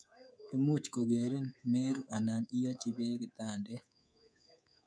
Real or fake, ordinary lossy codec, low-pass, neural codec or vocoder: fake; none; 9.9 kHz; codec, 24 kHz, 3.1 kbps, DualCodec